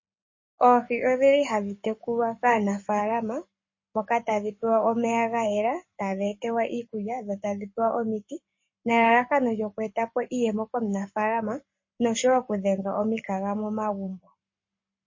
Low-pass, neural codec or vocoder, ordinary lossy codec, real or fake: 7.2 kHz; codec, 44.1 kHz, 7.8 kbps, Pupu-Codec; MP3, 32 kbps; fake